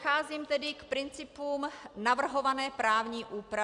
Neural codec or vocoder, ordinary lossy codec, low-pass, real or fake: none; Opus, 64 kbps; 10.8 kHz; real